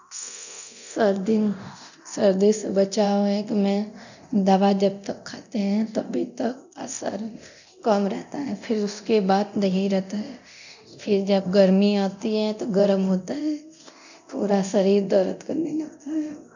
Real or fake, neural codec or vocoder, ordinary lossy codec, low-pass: fake; codec, 24 kHz, 0.9 kbps, DualCodec; none; 7.2 kHz